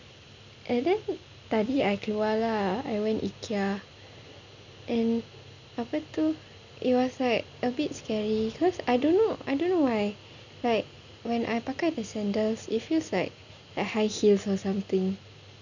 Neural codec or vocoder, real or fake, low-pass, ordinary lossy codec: none; real; 7.2 kHz; none